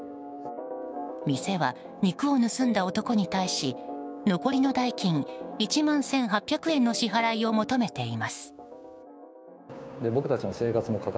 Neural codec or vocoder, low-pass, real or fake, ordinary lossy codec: codec, 16 kHz, 6 kbps, DAC; none; fake; none